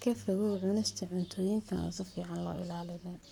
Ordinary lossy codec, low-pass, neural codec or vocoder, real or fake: none; 19.8 kHz; codec, 44.1 kHz, 7.8 kbps, Pupu-Codec; fake